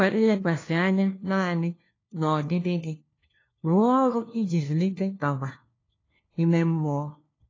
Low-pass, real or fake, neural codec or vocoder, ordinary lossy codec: 7.2 kHz; fake; codec, 16 kHz, 1 kbps, FunCodec, trained on LibriTTS, 50 frames a second; AAC, 32 kbps